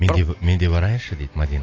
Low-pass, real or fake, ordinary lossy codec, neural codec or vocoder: 7.2 kHz; real; AAC, 32 kbps; none